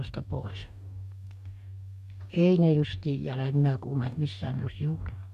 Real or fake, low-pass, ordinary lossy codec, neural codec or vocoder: fake; 14.4 kHz; none; codec, 44.1 kHz, 2.6 kbps, DAC